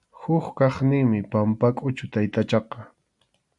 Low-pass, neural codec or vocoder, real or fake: 10.8 kHz; none; real